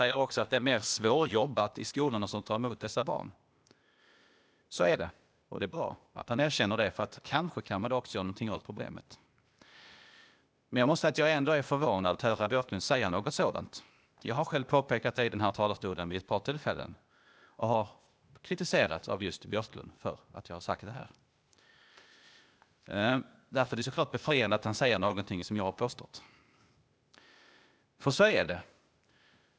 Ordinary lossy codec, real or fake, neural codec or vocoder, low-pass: none; fake; codec, 16 kHz, 0.8 kbps, ZipCodec; none